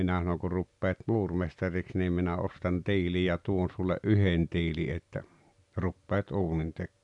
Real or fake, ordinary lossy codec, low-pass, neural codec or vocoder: real; none; 10.8 kHz; none